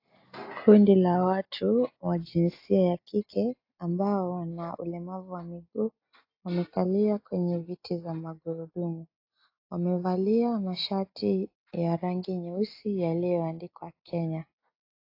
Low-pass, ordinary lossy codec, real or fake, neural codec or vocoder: 5.4 kHz; AAC, 32 kbps; real; none